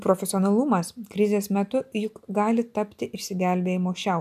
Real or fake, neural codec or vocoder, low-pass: real; none; 14.4 kHz